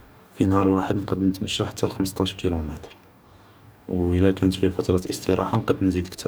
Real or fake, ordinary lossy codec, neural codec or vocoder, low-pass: fake; none; codec, 44.1 kHz, 2.6 kbps, DAC; none